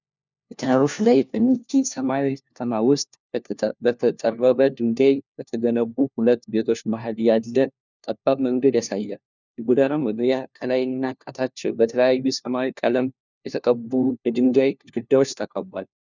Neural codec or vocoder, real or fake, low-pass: codec, 16 kHz, 1 kbps, FunCodec, trained on LibriTTS, 50 frames a second; fake; 7.2 kHz